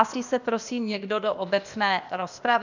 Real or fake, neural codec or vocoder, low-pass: fake; codec, 16 kHz, 0.8 kbps, ZipCodec; 7.2 kHz